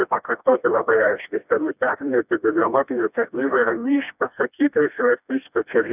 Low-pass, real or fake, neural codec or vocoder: 3.6 kHz; fake; codec, 16 kHz, 1 kbps, FreqCodec, smaller model